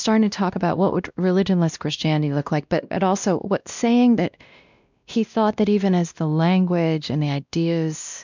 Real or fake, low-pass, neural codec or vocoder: fake; 7.2 kHz; codec, 16 kHz, 1 kbps, X-Codec, WavLM features, trained on Multilingual LibriSpeech